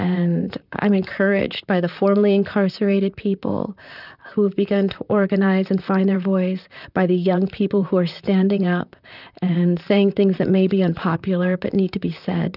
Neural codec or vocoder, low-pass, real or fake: vocoder, 44.1 kHz, 128 mel bands, Pupu-Vocoder; 5.4 kHz; fake